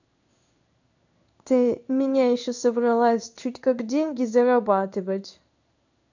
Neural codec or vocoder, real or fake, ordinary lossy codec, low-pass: codec, 16 kHz in and 24 kHz out, 1 kbps, XY-Tokenizer; fake; none; 7.2 kHz